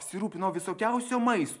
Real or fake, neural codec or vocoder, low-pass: real; none; 10.8 kHz